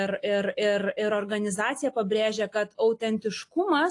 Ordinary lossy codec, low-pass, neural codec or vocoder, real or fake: AAC, 48 kbps; 10.8 kHz; none; real